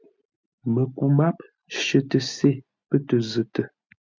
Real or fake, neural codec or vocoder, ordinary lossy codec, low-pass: real; none; MP3, 64 kbps; 7.2 kHz